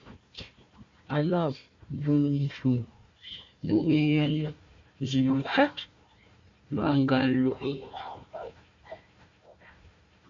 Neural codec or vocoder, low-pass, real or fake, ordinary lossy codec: codec, 16 kHz, 1 kbps, FunCodec, trained on Chinese and English, 50 frames a second; 7.2 kHz; fake; MP3, 48 kbps